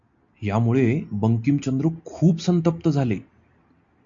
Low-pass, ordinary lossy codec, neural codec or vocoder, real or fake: 7.2 kHz; AAC, 64 kbps; none; real